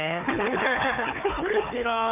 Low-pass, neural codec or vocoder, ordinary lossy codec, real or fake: 3.6 kHz; codec, 16 kHz, 4 kbps, FunCodec, trained on Chinese and English, 50 frames a second; none; fake